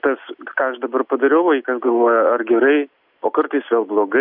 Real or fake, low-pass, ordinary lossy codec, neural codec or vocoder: real; 5.4 kHz; AAC, 48 kbps; none